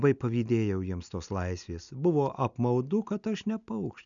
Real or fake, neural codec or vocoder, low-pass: real; none; 7.2 kHz